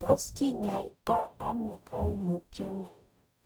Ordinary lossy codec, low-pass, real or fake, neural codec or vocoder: none; none; fake; codec, 44.1 kHz, 0.9 kbps, DAC